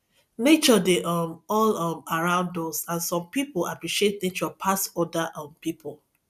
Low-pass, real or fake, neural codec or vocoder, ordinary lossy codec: 14.4 kHz; real; none; none